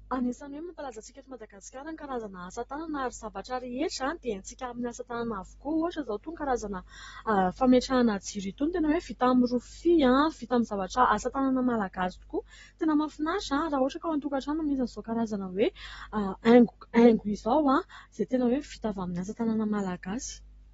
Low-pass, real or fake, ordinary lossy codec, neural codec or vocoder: 19.8 kHz; real; AAC, 24 kbps; none